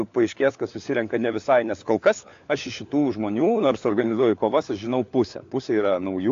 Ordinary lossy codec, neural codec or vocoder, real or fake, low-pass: AAC, 48 kbps; codec, 16 kHz, 4 kbps, FunCodec, trained on LibriTTS, 50 frames a second; fake; 7.2 kHz